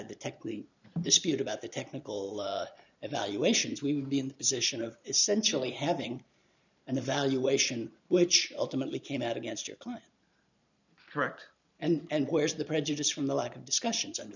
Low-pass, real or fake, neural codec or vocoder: 7.2 kHz; fake; vocoder, 44.1 kHz, 128 mel bands every 512 samples, BigVGAN v2